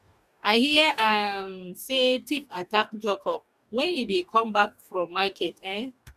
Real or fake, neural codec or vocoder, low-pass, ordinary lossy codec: fake; codec, 44.1 kHz, 2.6 kbps, DAC; 14.4 kHz; none